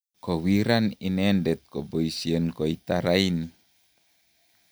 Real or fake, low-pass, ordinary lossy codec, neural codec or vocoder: real; none; none; none